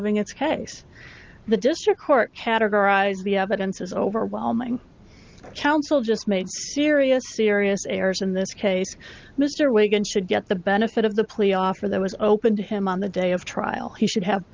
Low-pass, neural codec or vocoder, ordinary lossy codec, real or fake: 7.2 kHz; none; Opus, 32 kbps; real